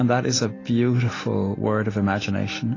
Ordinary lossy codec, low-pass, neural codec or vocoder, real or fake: AAC, 32 kbps; 7.2 kHz; none; real